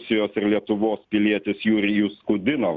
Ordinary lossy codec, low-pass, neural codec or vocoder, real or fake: Opus, 64 kbps; 7.2 kHz; none; real